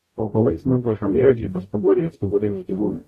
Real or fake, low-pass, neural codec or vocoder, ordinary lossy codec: fake; 14.4 kHz; codec, 44.1 kHz, 0.9 kbps, DAC; AAC, 48 kbps